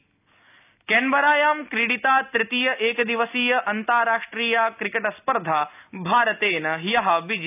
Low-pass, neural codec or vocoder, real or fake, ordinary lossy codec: 3.6 kHz; none; real; none